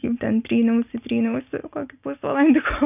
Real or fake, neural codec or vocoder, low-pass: real; none; 3.6 kHz